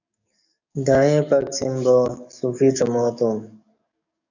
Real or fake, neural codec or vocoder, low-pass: fake; codec, 44.1 kHz, 7.8 kbps, DAC; 7.2 kHz